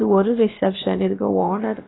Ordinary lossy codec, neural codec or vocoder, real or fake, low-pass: AAC, 16 kbps; codec, 16 kHz, about 1 kbps, DyCAST, with the encoder's durations; fake; 7.2 kHz